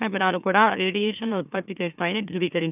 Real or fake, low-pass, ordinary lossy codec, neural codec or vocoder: fake; 3.6 kHz; none; autoencoder, 44.1 kHz, a latent of 192 numbers a frame, MeloTTS